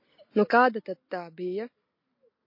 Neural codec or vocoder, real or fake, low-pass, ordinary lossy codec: none; real; 5.4 kHz; MP3, 32 kbps